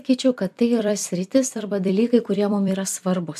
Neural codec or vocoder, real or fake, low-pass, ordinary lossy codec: vocoder, 44.1 kHz, 128 mel bands every 256 samples, BigVGAN v2; fake; 14.4 kHz; AAC, 96 kbps